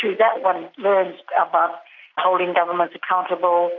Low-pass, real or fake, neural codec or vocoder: 7.2 kHz; real; none